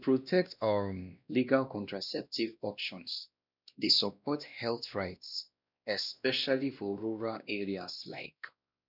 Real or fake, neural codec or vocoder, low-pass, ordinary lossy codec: fake; codec, 16 kHz, 1 kbps, X-Codec, WavLM features, trained on Multilingual LibriSpeech; 5.4 kHz; none